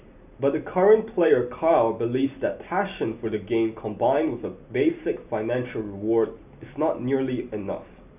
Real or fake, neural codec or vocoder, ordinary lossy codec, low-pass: real; none; none; 3.6 kHz